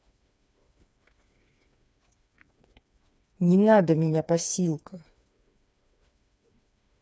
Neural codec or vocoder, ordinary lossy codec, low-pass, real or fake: codec, 16 kHz, 4 kbps, FreqCodec, smaller model; none; none; fake